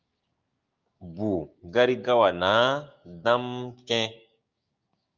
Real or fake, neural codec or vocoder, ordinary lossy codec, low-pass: fake; codec, 16 kHz, 6 kbps, DAC; Opus, 32 kbps; 7.2 kHz